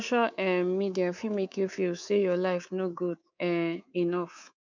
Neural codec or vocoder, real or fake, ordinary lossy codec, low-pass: codec, 24 kHz, 3.1 kbps, DualCodec; fake; MP3, 64 kbps; 7.2 kHz